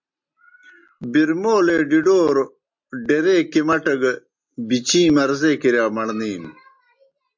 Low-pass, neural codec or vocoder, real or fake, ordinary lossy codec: 7.2 kHz; none; real; MP3, 48 kbps